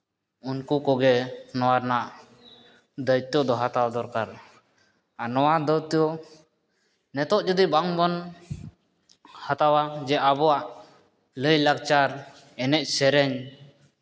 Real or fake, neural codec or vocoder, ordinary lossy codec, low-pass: real; none; none; none